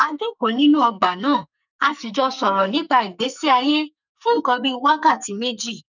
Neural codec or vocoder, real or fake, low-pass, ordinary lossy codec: codec, 44.1 kHz, 2.6 kbps, SNAC; fake; 7.2 kHz; none